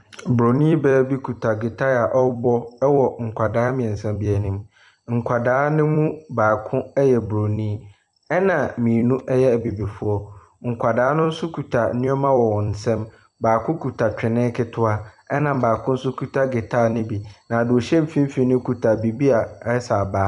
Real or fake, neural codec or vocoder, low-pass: fake; vocoder, 44.1 kHz, 128 mel bands every 256 samples, BigVGAN v2; 10.8 kHz